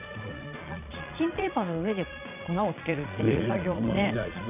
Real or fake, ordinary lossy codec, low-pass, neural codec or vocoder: fake; none; 3.6 kHz; vocoder, 22.05 kHz, 80 mel bands, Vocos